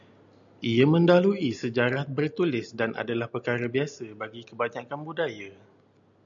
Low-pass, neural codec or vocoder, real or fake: 7.2 kHz; none; real